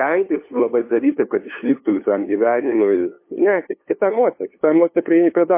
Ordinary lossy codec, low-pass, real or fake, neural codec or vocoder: MP3, 24 kbps; 3.6 kHz; fake; codec, 16 kHz, 2 kbps, FunCodec, trained on LibriTTS, 25 frames a second